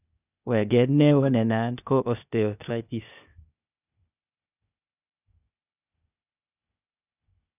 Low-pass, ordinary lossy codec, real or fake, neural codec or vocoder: 3.6 kHz; none; fake; codec, 16 kHz, 0.8 kbps, ZipCodec